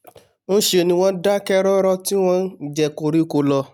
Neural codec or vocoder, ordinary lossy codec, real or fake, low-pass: none; none; real; 19.8 kHz